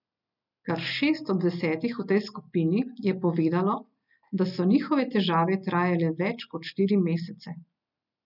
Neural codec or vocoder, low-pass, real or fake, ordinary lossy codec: none; 5.4 kHz; real; none